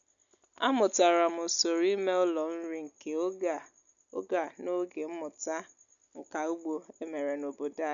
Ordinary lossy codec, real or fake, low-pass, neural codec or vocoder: none; real; 7.2 kHz; none